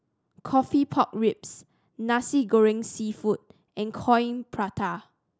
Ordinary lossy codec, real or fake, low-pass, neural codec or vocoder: none; real; none; none